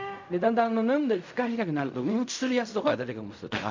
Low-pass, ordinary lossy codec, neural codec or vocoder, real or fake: 7.2 kHz; MP3, 64 kbps; codec, 16 kHz in and 24 kHz out, 0.4 kbps, LongCat-Audio-Codec, fine tuned four codebook decoder; fake